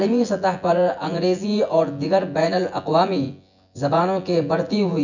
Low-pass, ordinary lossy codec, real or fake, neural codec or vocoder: 7.2 kHz; none; fake; vocoder, 24 kHz, 100 mel bands, Vocos